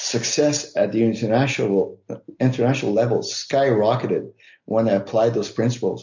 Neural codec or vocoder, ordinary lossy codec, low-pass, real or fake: none; MP3, 48 kbps; 7.2 kHz; real